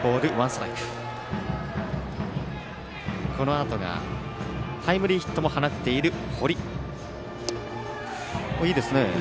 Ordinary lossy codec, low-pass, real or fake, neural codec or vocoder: none; none; real; none